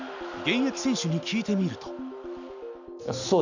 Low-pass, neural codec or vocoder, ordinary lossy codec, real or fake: 7.2 kHz; none; none; real